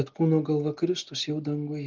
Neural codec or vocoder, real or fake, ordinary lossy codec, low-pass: none; real; Opus, 32 kbps; 7.2 kHz